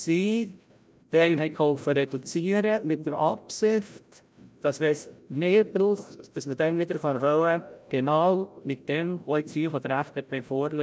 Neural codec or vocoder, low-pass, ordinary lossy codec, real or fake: codec, 16 kHz, 0.5 kbps, FreqCodec, larger model; none; none; fake